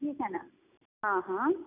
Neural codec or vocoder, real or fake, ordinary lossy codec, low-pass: none; real; none; 3.6 kHz